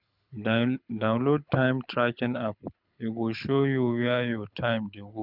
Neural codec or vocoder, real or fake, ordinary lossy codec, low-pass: codec, 24 kHz, 6 kbps, HILCodec; fake; none; 5.4 kHz